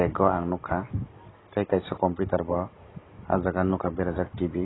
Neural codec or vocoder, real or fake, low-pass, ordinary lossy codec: none; real; 7.2 kHz; AAC, 16 kbps